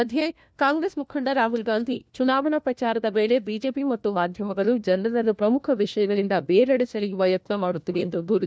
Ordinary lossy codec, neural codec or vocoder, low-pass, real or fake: none; codec, 16 kHz, 1 kbps, FunCodec, trained on LibriTTS, 50 frames a second; none; fake